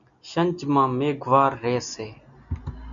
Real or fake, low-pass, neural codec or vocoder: real; 7.2 kHz; none